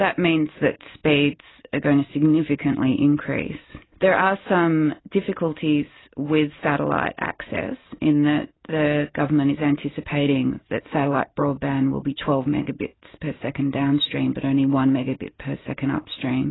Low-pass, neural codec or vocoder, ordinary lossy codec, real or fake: 7.2 kHz; none; AAC, 16 kbps; real